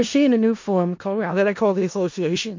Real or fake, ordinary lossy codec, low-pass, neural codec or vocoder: fake; MP3, 48 kbps; 7.2 kHz; codec, 16 kHz in and 24 kHz out, 0.4 kbps, LongCat-Audio-Codec, four codebook decoder